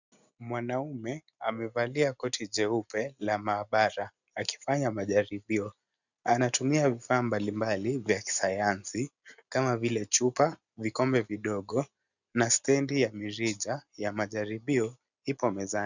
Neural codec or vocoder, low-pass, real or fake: none; 7.2 kHz; real